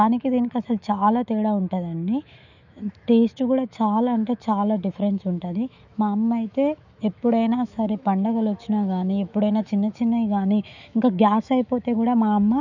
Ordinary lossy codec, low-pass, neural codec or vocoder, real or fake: none; 7.2 kHz; autoencoder, 48 kHz, 128 numbers a frame, DAC-VAE, trained on Japanese speech; fake